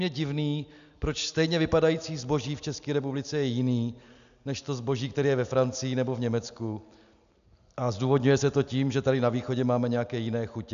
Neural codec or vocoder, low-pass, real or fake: none; 7.2 kHz; real